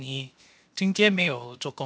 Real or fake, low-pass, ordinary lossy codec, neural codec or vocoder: fake; none; none; codec, 16 kHz, 0.7 kbps, FocalCodec